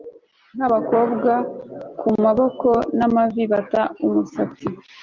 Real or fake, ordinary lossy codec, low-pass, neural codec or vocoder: real; Opus, 24 kbps; 7.2 kHz; none